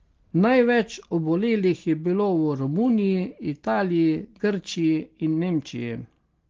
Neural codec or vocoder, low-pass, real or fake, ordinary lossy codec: none; 7.2 kHz; real; Opus, 16 kbps